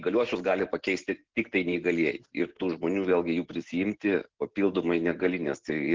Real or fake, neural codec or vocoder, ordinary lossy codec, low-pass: real; none; Opus, 24 kbps; 7.2 kHz